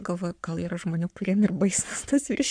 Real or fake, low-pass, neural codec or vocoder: fake; 9.9 kHz; codec, 44.1 kHz, 7.8 kbps, Pupu-Codec